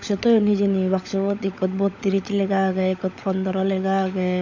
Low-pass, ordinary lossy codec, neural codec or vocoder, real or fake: 7.2 kHz; none; codec, 16 kHz, 16 kbps, FunCodec, trained on LibriTTS, 50 frames a second; fake